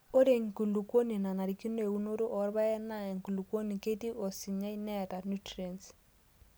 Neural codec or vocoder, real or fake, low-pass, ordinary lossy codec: none; real; none; none